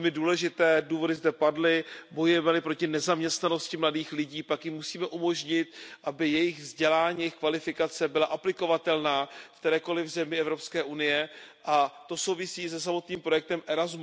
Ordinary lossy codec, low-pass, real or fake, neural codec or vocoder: none; none; real; none